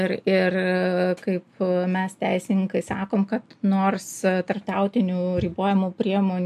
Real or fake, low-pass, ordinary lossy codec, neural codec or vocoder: real; 14.4 kHz; MP3, 64 kbps; none